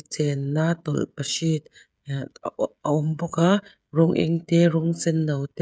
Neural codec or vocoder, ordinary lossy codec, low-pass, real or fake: codec, 16 kHz, 16 kbps, FreqCodec, smaller model; none; none; fake